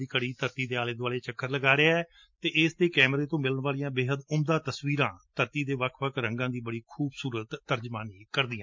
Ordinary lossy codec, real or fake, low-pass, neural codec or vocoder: MP3, 48 kbps; real; 7.2 kHz; none